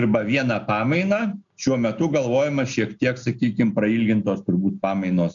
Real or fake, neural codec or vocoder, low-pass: real; none; 7.2 kHz